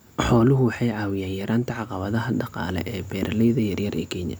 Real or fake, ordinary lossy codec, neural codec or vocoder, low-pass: real; none; none; none